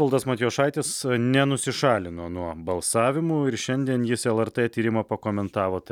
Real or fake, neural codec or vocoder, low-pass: real; none; 19.8 kHz